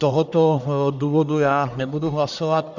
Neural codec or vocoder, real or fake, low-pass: codec, 44.1 kHz, 1.7 kbps, Pupu-Codec; fake; 7.2 kHz